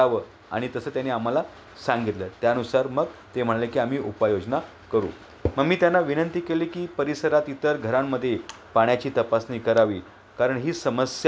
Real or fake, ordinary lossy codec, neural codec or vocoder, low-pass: real; none; none; none